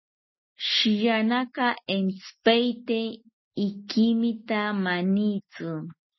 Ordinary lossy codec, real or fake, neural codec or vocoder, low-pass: MP3, 24 kbps; real; none; 7.2 kHz